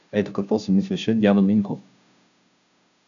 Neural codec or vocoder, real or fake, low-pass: codec, 16 kHz, 0.5 kbps, FunCodec, trained on Chinese and English, 25 frames a second; fake; 7.2 kHz